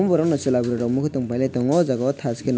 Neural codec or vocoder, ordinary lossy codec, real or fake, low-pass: none; none; real; none